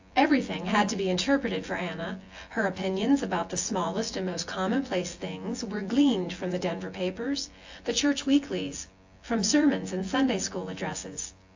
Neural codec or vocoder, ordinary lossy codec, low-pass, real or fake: vocoder, 24 kHz, 100 mel bands, Vocos; AAC, 48 kbps; 7.2 kHz; fake